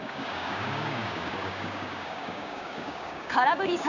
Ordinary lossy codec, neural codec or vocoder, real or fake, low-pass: none; codec, 16 kHz, 6 kbps, DAC; fake; 7.2 kHz